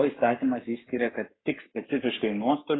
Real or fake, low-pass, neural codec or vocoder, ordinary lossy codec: fake; 7.2 kHz; codec, 44.1 kHz, 7.8 kbps, Pupu-Codec; AAC, 16 kbps